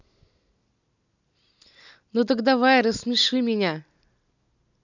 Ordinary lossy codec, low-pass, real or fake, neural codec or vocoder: none; 7.2 kHz; real; none